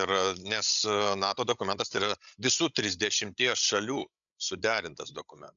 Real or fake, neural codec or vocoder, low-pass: fake; codec, 16 kHz, 16 kbps, FreqCodec, larger model; 7.2 kHz